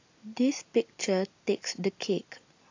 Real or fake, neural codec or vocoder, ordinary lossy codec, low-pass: real; none; AAC, 48 kbps; 7.2 kHz